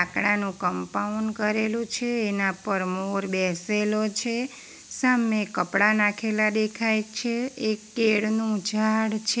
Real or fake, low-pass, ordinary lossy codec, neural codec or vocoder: real; none; none; none